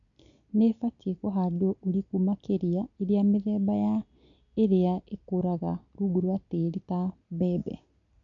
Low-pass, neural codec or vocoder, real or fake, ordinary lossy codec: 7.2 kHz; none; real; none